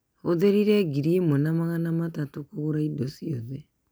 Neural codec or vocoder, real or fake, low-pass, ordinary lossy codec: none; real; none; none